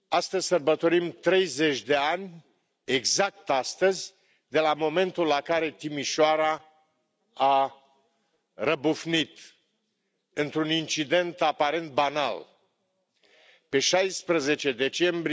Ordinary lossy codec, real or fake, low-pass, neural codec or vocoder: none; real; none; none